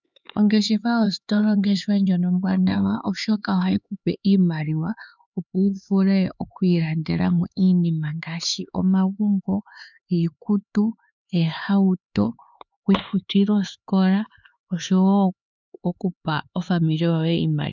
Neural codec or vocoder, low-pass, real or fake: codec, 16 kHz, 4 kbps, X-Codec, HuBERT features, trained on LibriSpeech; 7.2 kHz; fake